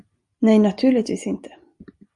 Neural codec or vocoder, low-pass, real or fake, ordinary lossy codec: none; 10.8 kHz; real; Opus, 64 kbps